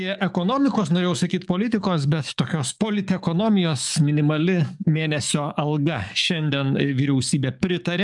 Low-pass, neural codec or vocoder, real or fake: 10.8 kHz; codec, 44.1 kHz, 7.8 kbps, DAC; fake